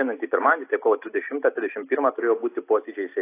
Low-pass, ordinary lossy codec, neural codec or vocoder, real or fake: 3.6 kHz; AAC, 24 kbps; none; real